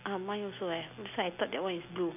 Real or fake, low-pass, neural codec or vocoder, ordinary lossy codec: real; 3.6 kHz; none; none